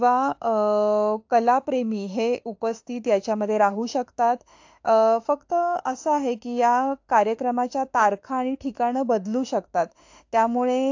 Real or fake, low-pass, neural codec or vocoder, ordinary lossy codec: fake; 7.2 kHz; autoencoder, 48 kHz, 32 numbers a frame, DAC-VAE, trained on Japanese speech; AAC, 48 kbps